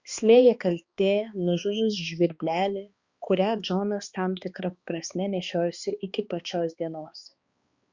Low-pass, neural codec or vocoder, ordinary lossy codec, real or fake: 7.2 kHz; codec, 16 kHz, 2 kbps, X-Codec, HuBERT features, trained on balanced general audio; Opus, 64 kbps; fake